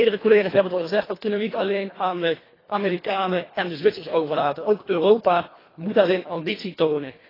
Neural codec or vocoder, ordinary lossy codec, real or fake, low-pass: codec, 24 kHz, 1.5 kbps, HILCodec; AAC, 24 kbps; fake; 5.4 kHz